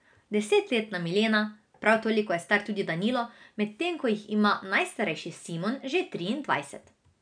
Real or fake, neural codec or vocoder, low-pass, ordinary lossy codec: real; none; 9.9 kHz; none